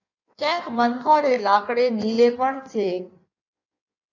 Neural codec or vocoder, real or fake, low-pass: codec, 16 kHz in and 24 kHz out, 1.1 kbps, FireRedTTS-2 codec; fake; 7.2 kHz